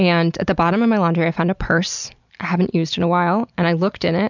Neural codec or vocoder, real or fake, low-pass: none; real; 7.2 kHz